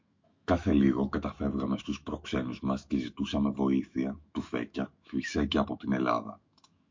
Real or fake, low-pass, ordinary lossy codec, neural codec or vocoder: fake; 7.2 kHz; MP3, 48 kbps; autoencoder, 48 kHz, 128 numbers a frame, DAC-VAE, trained on Japanese speech